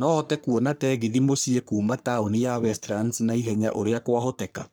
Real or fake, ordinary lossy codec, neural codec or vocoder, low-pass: fake; none; codec, 44.1 kHz, 3.4 kbps, Pupu-Codec; none